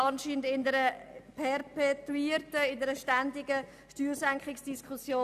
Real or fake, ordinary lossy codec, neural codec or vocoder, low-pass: real; none; none; 14.4 kHz